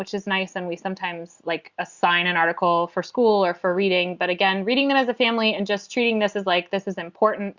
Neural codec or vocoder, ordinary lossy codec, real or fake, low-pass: none; Opus, 64 kbps; real; 7.2 kHz